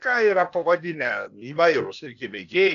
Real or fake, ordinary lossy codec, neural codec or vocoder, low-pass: fake; MP3, 96 kbps; codec, 16 kHz, 0.8 kbps, ZipCodec; 7.2 kHz